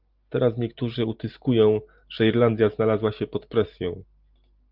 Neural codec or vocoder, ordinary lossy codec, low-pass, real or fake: none; Opus, 24 kbps; 5.4 kHz; real